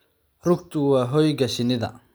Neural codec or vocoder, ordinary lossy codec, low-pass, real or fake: none; none; none; real